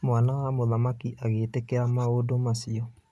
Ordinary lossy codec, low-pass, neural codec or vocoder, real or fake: none; none; none; real